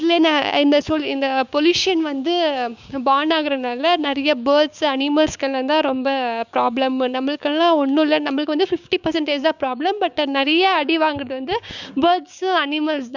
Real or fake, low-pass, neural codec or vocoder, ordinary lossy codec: fake; 7.2 kHz; codec, 16 kHz, 6 kbps, DAC; none